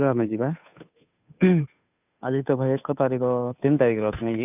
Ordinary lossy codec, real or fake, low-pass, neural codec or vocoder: none; fake; 3.6 kHz; codec, 16 kHz, 2 kbps, FunCodec, trained on Chinese and English, 25 frames a second